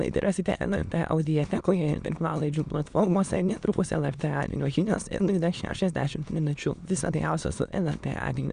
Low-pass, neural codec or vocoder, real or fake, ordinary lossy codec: 9.9 kHz; autoencoder, 22.05 kHz, a latent of 192 numbers a frame, VITS, trained on many speakers; fake; Opus, 64 kbps